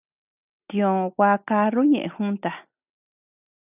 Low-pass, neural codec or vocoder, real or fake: 3.6 kHz; none; real